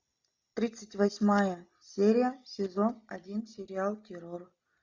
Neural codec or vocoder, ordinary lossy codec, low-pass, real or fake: none; MP3, 64 kbps; 7.2 kHz; real